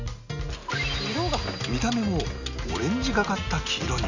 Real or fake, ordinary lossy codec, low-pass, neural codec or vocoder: real; none; 7.2 kHz; none